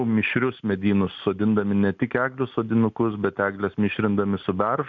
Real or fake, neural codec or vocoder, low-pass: real; none; 7.2 kHz